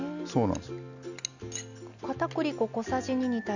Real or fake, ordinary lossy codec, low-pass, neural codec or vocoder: real; none; 7.2 kHz; none